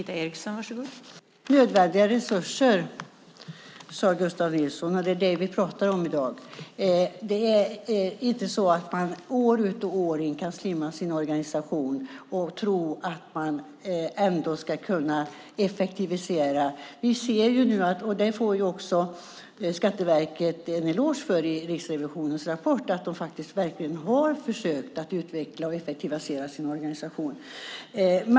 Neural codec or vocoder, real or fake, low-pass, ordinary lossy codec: none; real; none; none